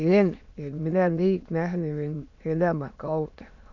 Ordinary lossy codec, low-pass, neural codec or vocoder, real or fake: none; 7.2 kHz; autoencoder, 22.05 kHz, a latent of 192 numbers a frame, VITS, trained on many speakers; fake